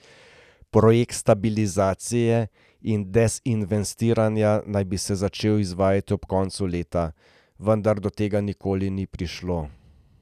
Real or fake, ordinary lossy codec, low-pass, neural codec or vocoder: real; none; 14.4 kHz; none